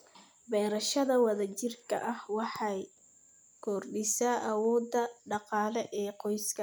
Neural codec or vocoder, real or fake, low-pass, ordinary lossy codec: none; real; none; none